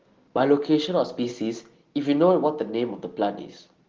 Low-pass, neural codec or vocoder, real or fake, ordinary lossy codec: 7.2 kHz; none; real; Opus, 16 kbps